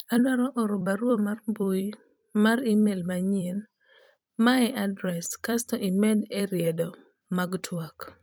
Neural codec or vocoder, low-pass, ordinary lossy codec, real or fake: vocoder, 44.1 kHz, 128 mel bands every 256 samples, BigVGAN v2; none; none; fake